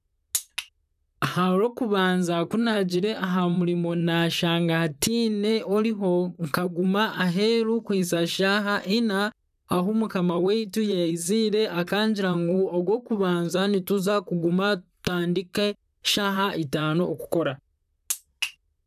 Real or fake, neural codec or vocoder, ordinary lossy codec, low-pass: fake; vocoder, 44.1 kHz, 128 mel bands, Pupu-Vocoder; none; 14.4 kHz